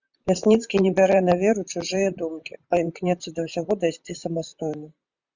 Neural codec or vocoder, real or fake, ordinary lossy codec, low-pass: vocoder, 44.1 kHz, 128 mel bands, Pupu-Vocoder; fake; Opus, 64 kbps; 7.2 kHz